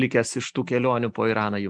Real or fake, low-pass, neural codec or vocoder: real; 9.9 kHz; none